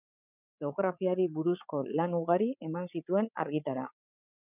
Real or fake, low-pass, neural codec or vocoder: fake; 3.6 kHz; codec, 16 kHz, 16 kbps, FreqCodec, larger model